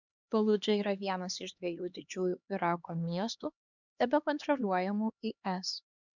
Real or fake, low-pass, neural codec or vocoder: fake; 7.2 kHz; codec, 16 kHz, 2 kbps, X-Codec, HuBERT features, trained on LibriSpeech